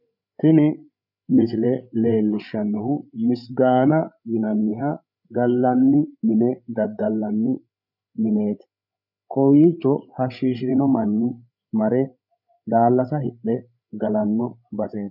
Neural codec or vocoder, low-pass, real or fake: codec, 16 kHz, 8 kbps, FreqCodec, larger model; 5.4 kHz; fake